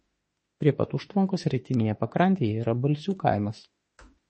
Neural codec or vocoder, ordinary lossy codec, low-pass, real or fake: autoencoder, 48 kHz, 32 numbers a frame, DAC-VAE, trained on Japanese speech; MP3, 32 kbps; 10.8 kHz; fake